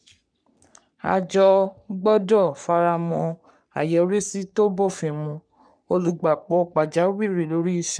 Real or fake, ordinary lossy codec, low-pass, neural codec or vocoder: fake; none; 9.9 kHz; codec, 44.1 kHz, 3.4 kbps, Pupu-Codec